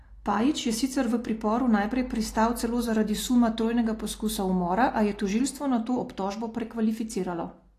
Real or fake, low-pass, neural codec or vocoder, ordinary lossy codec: real; 14.4 kHz; none; AAC, 48 kbps